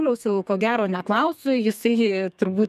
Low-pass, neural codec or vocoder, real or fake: 14.4 kHz; codec, 32 kHz, 1.9 kbps, SNAC; fake